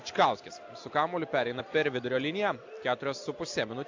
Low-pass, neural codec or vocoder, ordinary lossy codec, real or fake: 7.2 kHz; none; AAC, 48 kbps; real